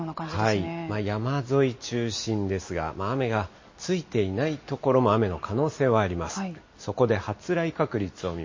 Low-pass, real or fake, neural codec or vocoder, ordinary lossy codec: 7.2 kHz; real; none; MP3, 32 kbps